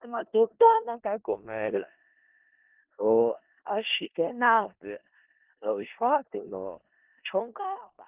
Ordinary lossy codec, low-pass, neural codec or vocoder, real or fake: Opus, 32 kbps; 3.6 kHz; codec, 16 kHz in and 24 kHz out, 0.4 kbps, LongCat-Audio-Codec, four codebook decoder; fake